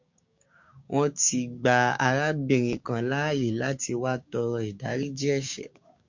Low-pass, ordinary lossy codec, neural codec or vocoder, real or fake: 7.2 kHz; MP3, 48 kbps; codec, 16 kHz, 6 kbps, DAC; fake